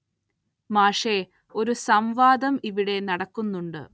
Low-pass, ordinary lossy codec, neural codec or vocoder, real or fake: none; none; none; real